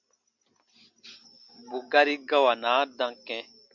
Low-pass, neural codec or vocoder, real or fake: 7.2 kHz; none; real